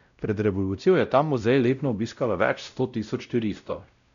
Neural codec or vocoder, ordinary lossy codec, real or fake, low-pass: codec, 16 kHz, 0.5 kbps, X-Codec, WavLM features, trained on Multilingual LibriSpeech; none; fake; 7.2 kHz